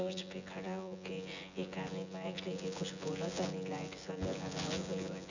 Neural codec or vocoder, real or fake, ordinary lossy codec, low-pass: vocoder, 24 kHz, 100 mel bands, Vocos; fake; none; 7.2 kHz